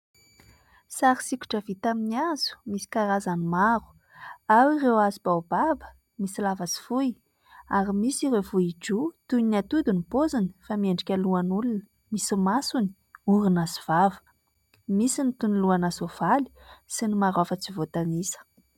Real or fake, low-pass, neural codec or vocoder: real; 19.8 kHz; none